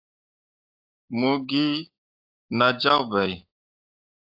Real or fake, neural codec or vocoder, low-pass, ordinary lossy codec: fake; codec, 16 kHz, 6 kbps, DAC; 5.4 kHz; AAC, 32 kbps